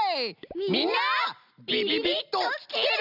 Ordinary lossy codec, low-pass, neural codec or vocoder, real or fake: none; 5.4 kHz; none; real